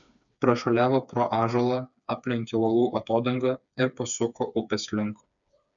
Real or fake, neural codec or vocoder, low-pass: fake; codec, 16 kHz, 4 kbps, FreqCodec, smaller model; 7.2 kHz